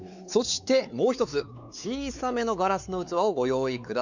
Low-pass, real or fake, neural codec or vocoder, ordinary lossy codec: 7.2 kHz; fake; codec, 16 kHz, 4 kbps, X-Codec, WavLM features, trained on Multilingual LibriSpeech; none